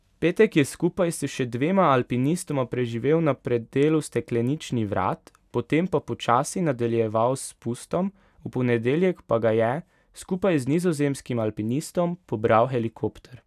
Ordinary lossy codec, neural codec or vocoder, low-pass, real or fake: none; none; 14.4 kHz; real